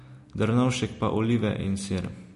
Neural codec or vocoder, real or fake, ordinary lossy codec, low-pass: none; real; MP3, 48 kbps; 14.4 kHz